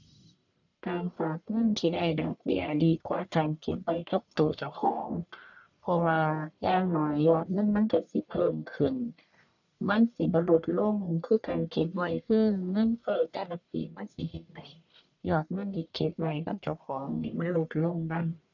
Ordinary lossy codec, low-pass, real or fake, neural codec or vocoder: none; 7.2 kHz; fake; codec, 44.1 kHz, 1.7 kbps, Pupu-Codec